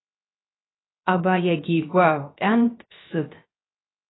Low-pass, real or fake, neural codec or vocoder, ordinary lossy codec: 7.2 kHz; fake; codec, 16 kHz, 0.3 kbps, FocalCodec; AAC, 16 kbps